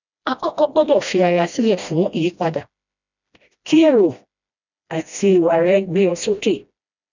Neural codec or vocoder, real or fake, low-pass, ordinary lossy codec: codec, 16 kHz, 1 kbps, FreqCodec, smaller model; fake; 7.2 kHz; none